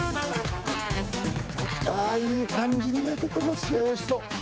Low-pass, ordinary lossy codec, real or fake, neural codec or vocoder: none; none; fake; codec, 16 kHz, 4 kbps, X-Codec, HuBERT features, trained on general audio